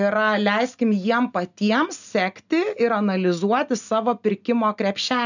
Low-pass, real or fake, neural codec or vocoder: 7.2 kHz; real; none